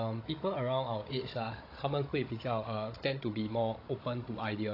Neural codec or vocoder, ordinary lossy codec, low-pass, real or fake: codec, 16 kHz, 16 kbps, FunCodec, trained on Chinese and English, 50 frames a second; AAC, 32 kbps; 5.4 kHz; fake